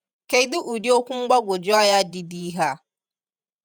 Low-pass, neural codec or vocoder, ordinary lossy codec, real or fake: none; vocoder, 48 kHz, 128 mel bands, Vocos; none; fake